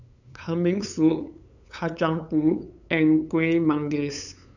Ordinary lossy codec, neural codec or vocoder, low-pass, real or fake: none; codec, 16 kHz, 8 kbps, FunCodec, trained on LibriTTS, 25 frames a second; 7.2 kHz; fake